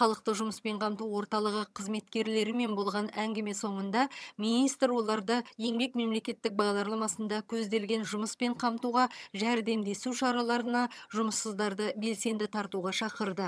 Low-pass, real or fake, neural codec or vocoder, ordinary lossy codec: none; fake; vocoder, 22.05 kHz, 80 mel bands, HiFi-GAN; none